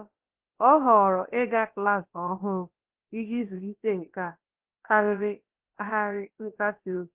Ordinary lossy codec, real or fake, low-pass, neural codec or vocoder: Opus, 16 kbps; fake; 3.6 kHz; codec, 16 kHz, about 1 kbps, DyCAST, with the encoder's durations